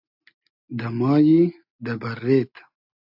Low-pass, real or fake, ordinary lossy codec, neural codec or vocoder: 5.4 kHz; real; AAC, 48 kbps; none